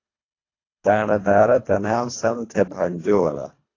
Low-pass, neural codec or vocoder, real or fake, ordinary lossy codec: 7.2 kHz; codec, 24 kHz, 1.5 kbps, HILCodec; fake; AAC, 32 kbps